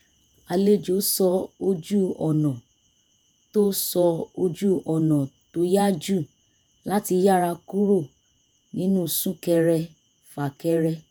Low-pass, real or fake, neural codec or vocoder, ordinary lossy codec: none; fake; vocoder, 48 kHz, 128 mel bands, Vocos; none